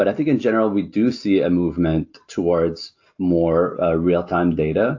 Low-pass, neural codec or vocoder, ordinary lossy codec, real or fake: 7.2 kHz; none; AAC, 48 kbps; real